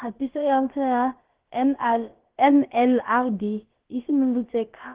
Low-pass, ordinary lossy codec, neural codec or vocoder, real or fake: 3.6 kHz; Opus, 16 kbps; codec, 16 kHz, about 1 kbps, DyCAST, with the encoder's durations; fake